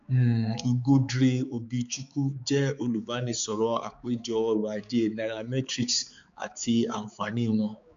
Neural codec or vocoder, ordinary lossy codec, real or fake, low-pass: codec, 16 kHz, 4 kbps, X-Codec, HuBERT features, trained on balanced general audio; MP3, 64 kbps; fake; 7.2 kHz